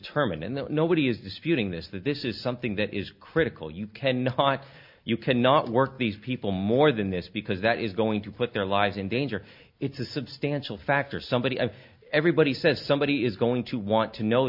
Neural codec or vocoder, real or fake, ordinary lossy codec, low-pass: none; real; MP3, 48 kbps; 5.4 kHz